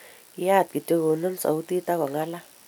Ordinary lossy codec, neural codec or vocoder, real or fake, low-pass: none; none; real; none